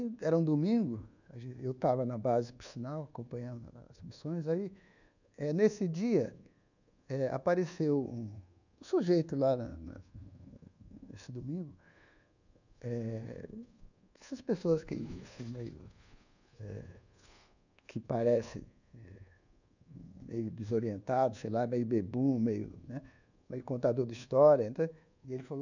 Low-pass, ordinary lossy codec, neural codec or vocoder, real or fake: 7.2 kHz; none; codec, 24 kHz, 1.2 kbps, DualCodec; fake